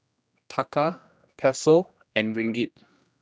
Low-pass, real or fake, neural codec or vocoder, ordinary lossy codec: none; fake; codec, 16 kHz, 1 kbps, X-Codec, HuBERT features, trained on general audio; none